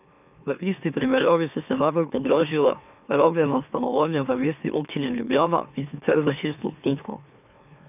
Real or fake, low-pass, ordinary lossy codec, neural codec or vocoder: fake; 3.6 kHz; none; autoencoder, 44.1 kHz, a latent of 192 numbers a frame, MeloTTS